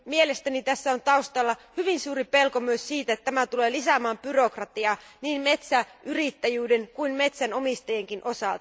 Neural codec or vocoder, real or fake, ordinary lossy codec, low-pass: none; real; none; none